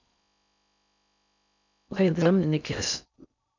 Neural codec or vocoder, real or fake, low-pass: codec, 16 kHz in and 24 kHz out, 0.6 kbps, FocalCodec, streaming, 4096 codes; fake; 7.2 kHz